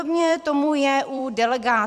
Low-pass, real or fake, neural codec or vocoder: 14.4 kHz; fake; vocoder, 44.1 kHz, 128 mel bands every 256 samples, BigVGAN v2